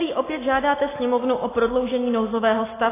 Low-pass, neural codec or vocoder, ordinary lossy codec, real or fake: 3.6 kHz; none; AAC, 16 kbps; real